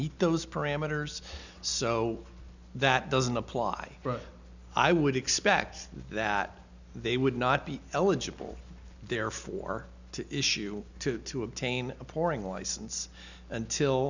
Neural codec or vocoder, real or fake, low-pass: none; real; 7.2 kHz